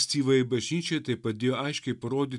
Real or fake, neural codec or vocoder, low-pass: real; none; 10.8 kHz